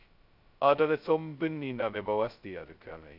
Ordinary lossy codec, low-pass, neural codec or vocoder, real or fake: none; 5.4 kHz; codec, 16 kHz, 0.2 kbps, FocalCodec; fake